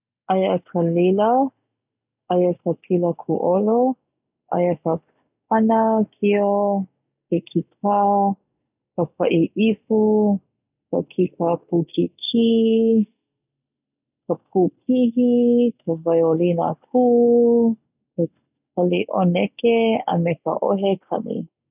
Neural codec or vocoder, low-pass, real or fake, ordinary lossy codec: none; 3.6 kHz; real; none